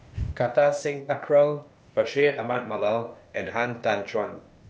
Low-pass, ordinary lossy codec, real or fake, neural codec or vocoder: none; none; fake; codec, 16 kHz, 0.8 kbps, ZipCodec